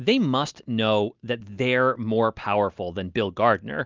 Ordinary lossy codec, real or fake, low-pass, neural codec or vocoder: Opus, 32 kbps; real; 7.2 kHz; none